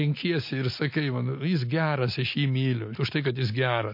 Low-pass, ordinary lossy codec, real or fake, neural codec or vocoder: 5.4 kHz; MP3, 32 kbps; real; none